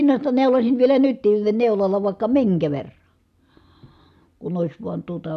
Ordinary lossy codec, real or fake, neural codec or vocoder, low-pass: none; real; none; 14.4 kHz